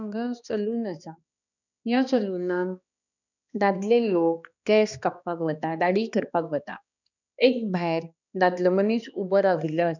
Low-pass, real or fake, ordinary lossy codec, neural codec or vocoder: 7.2 kHz; fake; none; codec, 16 kHz, 2 kbps, X-Codec, HuBERT features, trained on balanced general audio